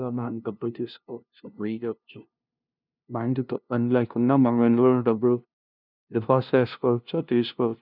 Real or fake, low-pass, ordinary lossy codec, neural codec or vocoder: fake; 5.4 kHz; none; codec, 16 kHz, 0.5 kbps, FunCodec, trained on LibriTTS, 25 frames a second